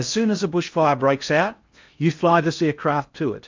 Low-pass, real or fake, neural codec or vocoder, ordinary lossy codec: 7.2 kHz; fake; codec, 16 kHz in and 24 kHz out, 0.6 kbps, FocalCodec, streaming, 4096 codes; MP3, 64 kbps